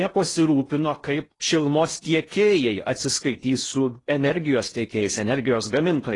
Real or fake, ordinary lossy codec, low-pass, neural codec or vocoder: fake; AAC, 32 kbps; 10.8 kHz; codec, 16 kHz in and 24 kHz out, 0.8 kbps, FocalCodec, streaming, 65536 codes